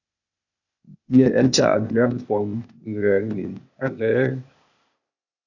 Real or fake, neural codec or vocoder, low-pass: fake; codec, 16 kHz, 0.8 kbps, ZipCodec; 7.2 kHz